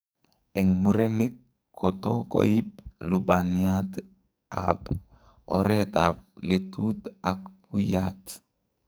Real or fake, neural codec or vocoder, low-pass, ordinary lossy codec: fake; codec, 44.1 kHz, 2.6 kbps, SNAC; none; none